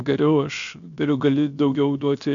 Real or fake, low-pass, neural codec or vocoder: fake; 7.2 kHz; codec, 16 kHz, 0.7 kbps, FocalCodec